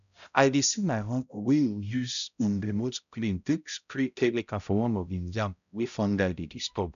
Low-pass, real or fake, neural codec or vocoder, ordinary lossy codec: 7.2 kHz; fake; codec, 16 kHz, 0.5 kbps, X-Codec, HuBERT features, trained on balanced general audio; none